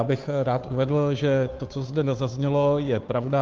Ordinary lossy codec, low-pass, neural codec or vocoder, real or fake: Opus, 32 kbps; 7.2 kHz; codec, 16 kHz, 2 kbps, FunCodec, trained on Chinese and English, 25 frames a second; fake